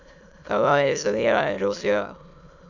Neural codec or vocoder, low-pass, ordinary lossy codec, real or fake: autoencoder, 22.05 kHz, a latent of 192 numbers a frame, VITS, trained on many speakers; 7.2 kHz; none; fake